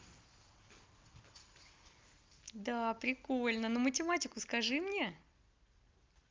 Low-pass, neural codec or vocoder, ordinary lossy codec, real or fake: 7.2 kHz; none; Opus, 32 kbps; real